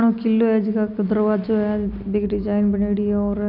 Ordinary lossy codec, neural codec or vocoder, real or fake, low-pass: none; none; real; 5.4 kHz